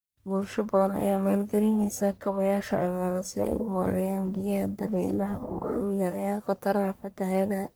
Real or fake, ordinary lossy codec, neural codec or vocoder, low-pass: fake; none; codec, 44.1 kHz, 1.7 kbps, Pupu-Codec; none